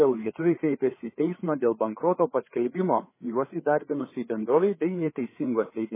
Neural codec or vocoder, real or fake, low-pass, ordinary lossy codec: codec, 16 kHz, 4 kbps, FreqCodec, larger model; fake; 3.6 kHz; MP3, 16 kbps